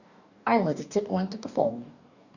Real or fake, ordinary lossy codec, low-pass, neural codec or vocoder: fake; none; 7.2 kHz; codec, 44.1 kHz, 2.6 kbps, DAC